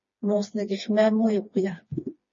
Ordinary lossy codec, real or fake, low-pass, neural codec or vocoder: MP3, 32 kbps; fake; 7.2 kHz; codec, 16 kHz, 2 kbps, FreqCodec, smaller model